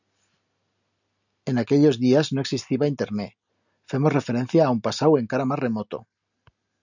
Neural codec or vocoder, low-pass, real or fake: none; 7.2 kHz; real